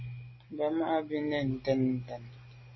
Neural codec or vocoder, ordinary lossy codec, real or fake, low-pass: none; MP3, 24 kbps; real; 7.2 kHz